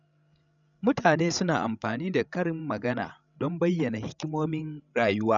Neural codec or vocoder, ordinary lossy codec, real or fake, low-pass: codec, 16 kHz, 16 kbps, FreqCodec, larger model; none; fake; 7.2 kHz